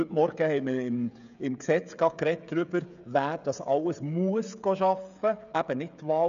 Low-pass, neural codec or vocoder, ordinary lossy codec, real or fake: 7.2 kHz; codec, 16 kHz, 16 kbps, FreqCodec, smaller model; none; fake